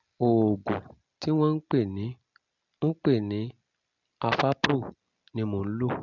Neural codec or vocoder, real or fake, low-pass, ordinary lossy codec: none; real; 7.2 kHz; none